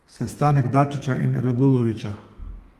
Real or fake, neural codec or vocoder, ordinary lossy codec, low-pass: fake; codec, 32 kHz, 1.9 kbps, SNAC; Opus, 32 kbps; 14.4 kHz